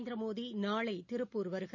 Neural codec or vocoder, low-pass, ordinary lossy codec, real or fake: none; 7.2 kHz; none; real